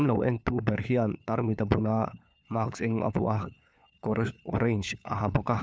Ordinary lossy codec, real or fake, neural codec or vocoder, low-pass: none; fake; codec, 16 kHz, 4 kbps, FunCodec, trained on LibriTTS, 50 frames a second; none